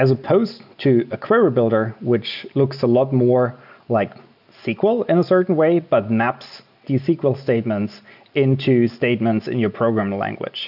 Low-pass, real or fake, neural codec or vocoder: 5.4 kHz; real; none